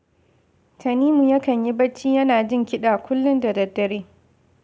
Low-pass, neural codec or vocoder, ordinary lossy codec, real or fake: none; none; none; real